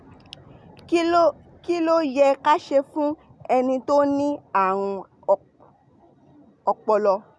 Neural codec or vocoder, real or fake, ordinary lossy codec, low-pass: none; real; none; none